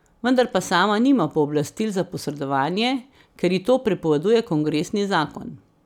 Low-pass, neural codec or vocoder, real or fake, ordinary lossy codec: 19.8 kHz; none; real; none